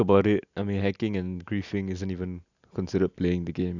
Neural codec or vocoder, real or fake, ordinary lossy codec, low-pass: none; real; none; 7.2 kHz